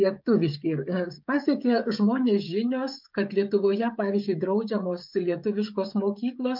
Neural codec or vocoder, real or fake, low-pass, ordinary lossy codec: codec, 16 kHz, 16 kbps, FreqCodec, smaller model; fake; 5.4 kHz; MP3, 48 kbps